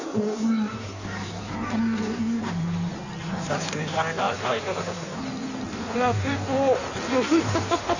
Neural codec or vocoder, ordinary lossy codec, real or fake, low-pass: codec, 16 kHz in and 24 kHz out, 1.1 kbps, FireRedTTS-2 codec; none; fake; 7.2 kHz